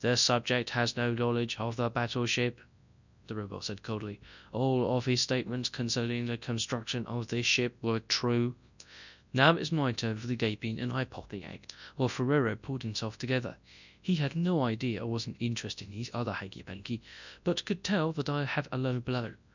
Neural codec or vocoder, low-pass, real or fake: codec, 24 kHz, 0.9 kbps, WavTokenizer, large speech release; 7.2 kHz; fake